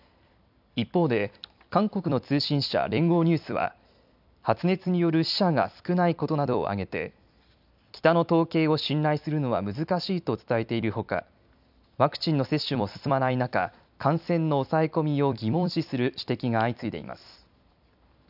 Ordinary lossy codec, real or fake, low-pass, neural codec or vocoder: none; fake; 5.4 kHz; vocoder, 44.1 kHz, 128 mel bands every 256 samples, BigVGAN v2